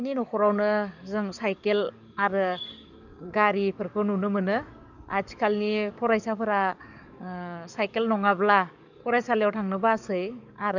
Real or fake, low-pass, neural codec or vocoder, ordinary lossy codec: fake; 7.2 kHz; codec, 44.1 kHz, 7.8 kbps, DAC; none